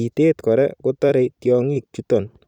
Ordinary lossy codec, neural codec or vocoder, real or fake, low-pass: none; vocoder, 44.1 kHz, 128 mel bands every 256 samples, BigVGAN v2; fake; 19.8 kHz